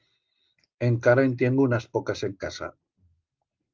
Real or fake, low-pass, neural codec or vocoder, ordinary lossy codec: real; 7.2 kHz; none; Opus, 32 kbps